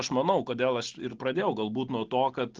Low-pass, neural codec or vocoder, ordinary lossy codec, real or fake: 7.2 kHz; none; Opus, 16 kbps; real